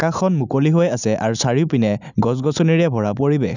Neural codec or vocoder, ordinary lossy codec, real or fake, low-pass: none; none; real; 7.2 kHz